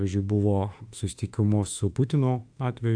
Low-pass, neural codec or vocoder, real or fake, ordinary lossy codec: 9.9 kHz; autoencoder, 48 kHz, 32 numbers a frame, DAC-VAE, trained on Japanese speech; fake; AAC, 64 kbps